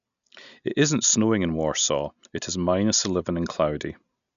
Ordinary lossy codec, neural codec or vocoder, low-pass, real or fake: none; none; 7.2 kHz; real